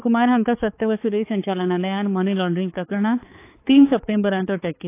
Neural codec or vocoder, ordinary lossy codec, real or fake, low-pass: codec, 16 kHz, 4 kbps, X-Codec, HuBERT features, trained on balanced general audio; AAC, 24 kbps; fake; 3.6 kHz